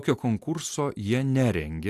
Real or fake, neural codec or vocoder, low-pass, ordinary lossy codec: real; none; 14.4 kHz; AAC, 64 kbps